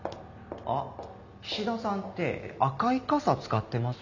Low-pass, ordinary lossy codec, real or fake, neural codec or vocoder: 7.2 kHz; none; real; none